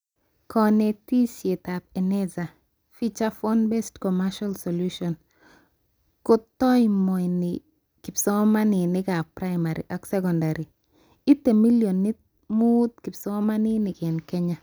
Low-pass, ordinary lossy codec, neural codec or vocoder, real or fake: none; none; none; real